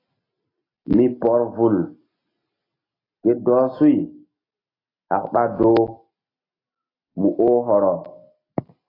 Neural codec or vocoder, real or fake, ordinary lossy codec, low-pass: none; real; AAC, 24 kbps; 5.4 kHz